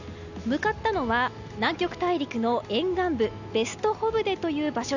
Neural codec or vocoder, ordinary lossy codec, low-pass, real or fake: none; none; 7.2 kHz; real